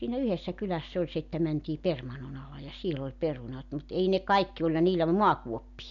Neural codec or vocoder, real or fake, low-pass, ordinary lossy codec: none; real; 7.2 kHz; none